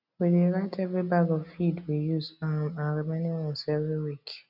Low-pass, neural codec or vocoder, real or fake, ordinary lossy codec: 5.4 kHz; none; real; none